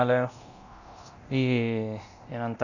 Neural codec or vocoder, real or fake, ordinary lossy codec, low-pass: codec, 24 kHz, 0.9 kbps, DualCodec; fake; none; 7.2 kHz